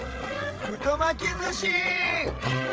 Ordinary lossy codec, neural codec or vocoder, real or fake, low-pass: none; codec, 16 kHz, 8 kbps, FreqCodec, larger model; fake; none